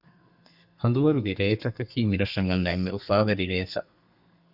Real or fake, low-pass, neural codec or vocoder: fake; 5.4 kHz; codec, 32 kHz, 1.9 kbps, SNAC